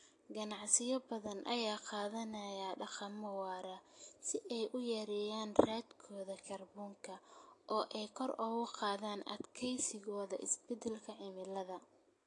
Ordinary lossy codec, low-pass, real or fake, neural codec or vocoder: AAC, 48 kbps; 10.8 kHz; real; none